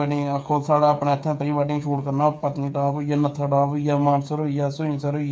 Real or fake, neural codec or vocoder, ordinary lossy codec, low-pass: fake; codec, 16 kHz, 8 kbps, FreqCodec, smaller model; none; none